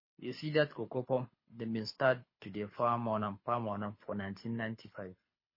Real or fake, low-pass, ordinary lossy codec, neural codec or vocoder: fake; 5.4 kHz; MP3, 24 kbps; codec, 24 kHz, 6 kbps, HILCodec